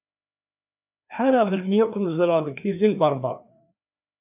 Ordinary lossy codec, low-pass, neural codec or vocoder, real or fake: AAC, 32 kbps; 3.6 kHz; codec, 16 kHz, 2 kbps, FreqCodec, larger model; fake